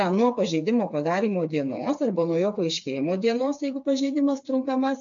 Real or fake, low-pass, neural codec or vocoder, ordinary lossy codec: fake; 7.2 kHz; codec, 16 kHz, 4 kbps, FreqCodec, smaller model; AAC, 64 kbps